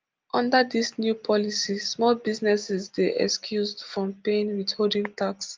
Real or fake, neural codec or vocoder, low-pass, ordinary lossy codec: real; none; 7.2 kHz; Opus, 32 kbps